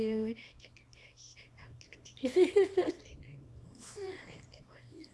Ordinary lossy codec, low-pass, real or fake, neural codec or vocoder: none; none; fake; codec, 24 kHz, 0.9 kbps, WavTokenizer, small release